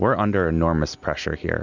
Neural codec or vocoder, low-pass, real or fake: none; 7.2 kHz; real